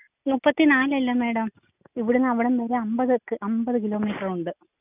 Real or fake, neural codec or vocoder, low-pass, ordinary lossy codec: real; none; 3.6 kHz; none